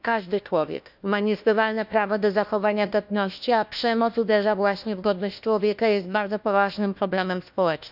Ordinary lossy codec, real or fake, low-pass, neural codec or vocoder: none; fake; 5.4 kHz; codec, 16 kHz, 1 kbps, FunCodec, trained on LibriTTS, 50 frames a second